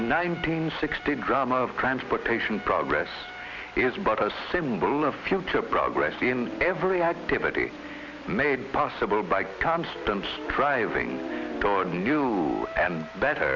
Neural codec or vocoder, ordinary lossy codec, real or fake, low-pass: none; Opus, 64 kbps; real; 7.2 kHz